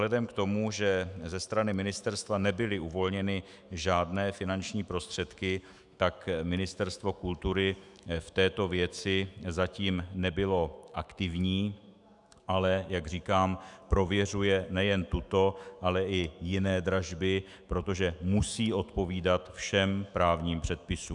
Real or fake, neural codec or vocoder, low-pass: fake; autoencoder, 48 kHz, 128 numbers a frame, DAC-VAE, trained on Japanese speech; 10.8 kHz